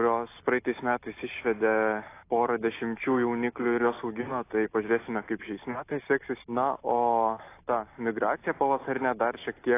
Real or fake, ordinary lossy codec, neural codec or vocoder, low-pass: real; AAC, 24 kbps; none; 3.6 kHz